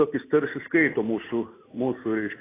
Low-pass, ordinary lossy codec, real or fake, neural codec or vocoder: 3.6 kHz; AAC, 16 kbps; real; none